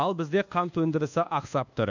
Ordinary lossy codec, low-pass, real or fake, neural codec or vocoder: none; 7.2 kHz; fake; codec, 24 kHz, 1.2 kbps, DualCodec